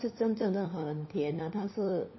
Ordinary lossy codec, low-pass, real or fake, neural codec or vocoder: MP3, 24 kbps; 7.2 kHz; fake; codec, 16 kHz, 4 kbps, FunCodec, trained on LibriTTS, 50 frames a second